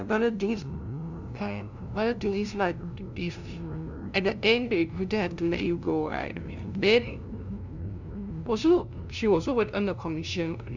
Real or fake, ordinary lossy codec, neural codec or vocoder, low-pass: fake; none; codec, 16 kHz, 0.5 kbps, FunCodec, trained on LibriTTS, 25 frames a second; 7.2 kHz